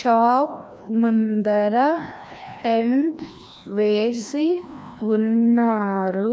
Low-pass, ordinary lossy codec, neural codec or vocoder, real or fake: none; none; codec, 16 kHz, 1 kbps, FreqCodec, larger model; fake